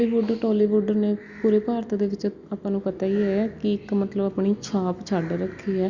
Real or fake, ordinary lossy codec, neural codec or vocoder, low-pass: real; Opus, 64 kbps; none; 7.2 kHz